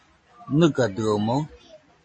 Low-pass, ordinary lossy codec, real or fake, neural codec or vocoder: 9.9 kHz; MP3, 32 kbps; real; none